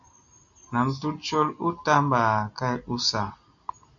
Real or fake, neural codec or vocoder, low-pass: real; none; 7.2 kHz